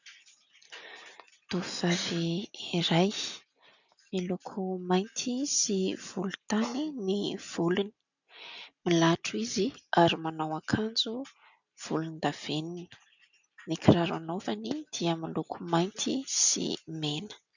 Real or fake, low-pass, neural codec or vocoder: real; 7.2 kHz; none